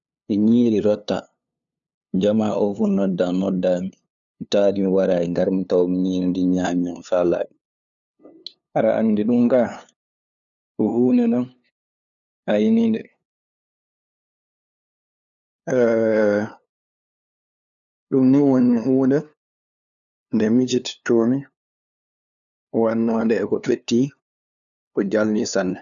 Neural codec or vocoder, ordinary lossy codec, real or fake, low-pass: codec, 16 kHz, 2 kbps, FunCodec, trained on LibriTTS, 25 frames a second; none; fake; 7.2 kHz